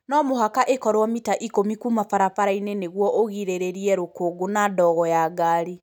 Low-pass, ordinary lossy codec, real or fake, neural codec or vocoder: 19.8 kHz; none; real; none